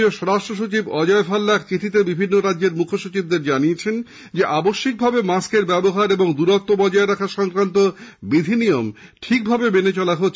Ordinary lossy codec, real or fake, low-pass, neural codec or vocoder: none; real; none; none